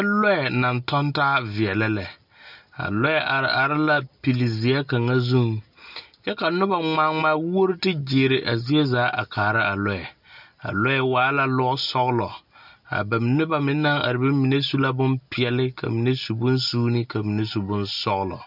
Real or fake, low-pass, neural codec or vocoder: real; 5.4 kHz; none